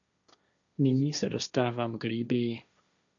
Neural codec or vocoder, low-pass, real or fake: codec, 16 kHz, 1.1 kbps, Voila-Tokenizer; 7.2 kHz; fake